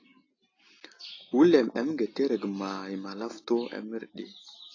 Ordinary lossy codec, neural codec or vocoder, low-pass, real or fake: AAC, 32 kbps; none; 7.2 kHz; real